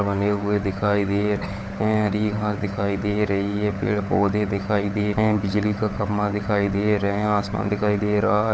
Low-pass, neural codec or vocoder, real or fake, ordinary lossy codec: none; codec, 16 kHz, 16 kbps, FunCodec, trained on LibriTTS, 50 frames a second; fake; none